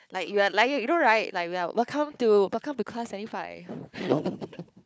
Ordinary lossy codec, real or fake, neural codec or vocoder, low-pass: none; fake; codec, 16 kHz, 4 kbps, FunCodec, trained on Chinese and English, 50 frames a second; none